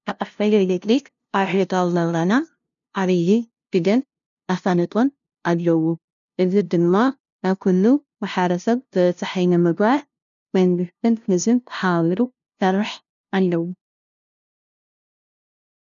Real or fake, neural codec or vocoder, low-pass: fake; codec, 16 kHz, 0.5 kbps, FunCodec, trained on LibriTTS, 25 frames a second; 7.2 kHz